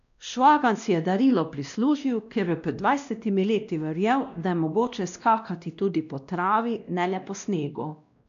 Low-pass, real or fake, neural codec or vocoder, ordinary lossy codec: 7.2 kHz; fake; codec, 16 kHz, 1 kbps, X-Codec, WavLM features, trained on Multilingual LibriSpeech; none